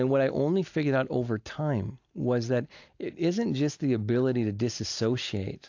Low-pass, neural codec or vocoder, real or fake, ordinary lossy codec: 7.2 kHz; none; real; AAC, 48 kbps